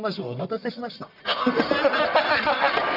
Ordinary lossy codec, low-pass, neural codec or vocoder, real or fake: none; 5.4 kHz; codec, 44.1 kHz, 1.7 kbps, Pupu-Codec; fake